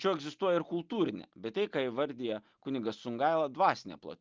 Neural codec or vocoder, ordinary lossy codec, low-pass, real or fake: none; Opus, 24 kbps; 7.2 kHz; real